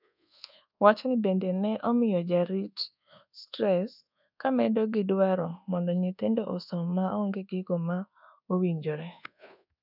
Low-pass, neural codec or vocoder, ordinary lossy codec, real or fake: 5.4 kHz; codec, 24 kHz, 1.2 kbps, DualCodec; none; fake